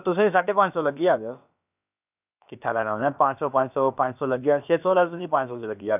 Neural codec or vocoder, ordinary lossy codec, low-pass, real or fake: codec, 16 kHz, about 1 kbps, DyCAST, with the encoder's durations; none; 3.6 kHz; fake